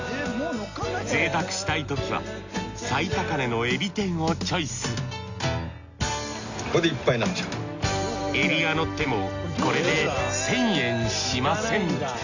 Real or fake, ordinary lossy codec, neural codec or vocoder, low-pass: real; Opus, 64 kbps; none; 7.2 kHz